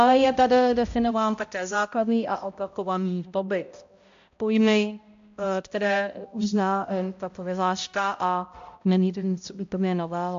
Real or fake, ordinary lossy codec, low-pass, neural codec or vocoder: fake; AAC, 64 kbps; 7.2 kHz; codec, 16 kHz, 0.5 kbps, X-Codec, HuBERT features, trained on balanced general audio